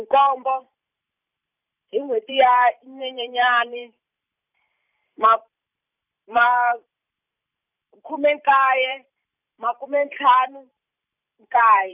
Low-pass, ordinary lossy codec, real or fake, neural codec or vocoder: 3.6 kHz; none; fake; vocoder, 44.1 kHz, 128 mel bands every 512 samples, BigVGAN v2